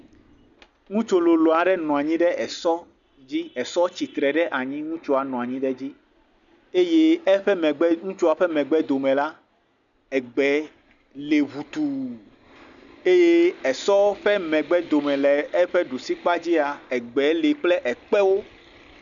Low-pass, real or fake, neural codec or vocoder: 7.2 kHz; real; none